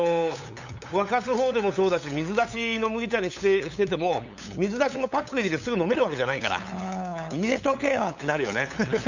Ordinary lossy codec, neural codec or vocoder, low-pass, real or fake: none; codec, 16 kHz, 8 kbps, FunCodec, trained on LibriTTS, 25 frames a second; 7.2 kHz; fake